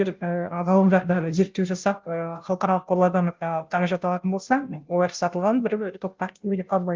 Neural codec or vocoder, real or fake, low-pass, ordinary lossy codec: codec, 16 kHz, 0.5 kbps, FunCodec, trained on Chinese and English, 25 frames a second; fake; 7.2 kHz; Opus, 32 kbps